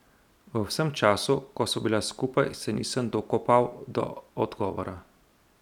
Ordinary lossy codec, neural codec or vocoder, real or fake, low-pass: none; none; real; 19.8 kHz